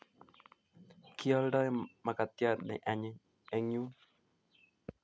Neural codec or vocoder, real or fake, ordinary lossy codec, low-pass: none; real; none; none